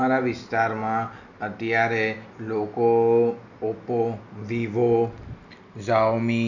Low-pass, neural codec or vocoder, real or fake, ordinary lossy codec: 7.2 kHz; none; real; none